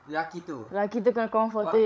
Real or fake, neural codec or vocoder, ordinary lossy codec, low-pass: fake; codec, 16 kHz, 16 kbps, FreqCodec, larger model; none; none